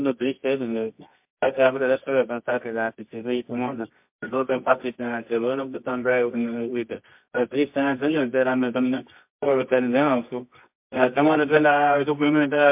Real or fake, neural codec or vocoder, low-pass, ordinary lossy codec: fake; codec, 24 kHz, 0.9 kbps, WavTokenizer, medium music audio release; 3.6 kHz; MP3, 32 kbps